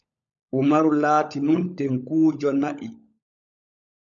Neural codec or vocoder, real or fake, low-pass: codec, 16 kHz, 16 kbps, FunCodec, trained on LibriTTS, 50 frames a second; fake; 7.2 kHz